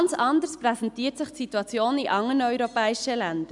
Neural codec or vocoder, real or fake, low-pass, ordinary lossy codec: none; real; 10.8 kHz; MP3, 96 kbps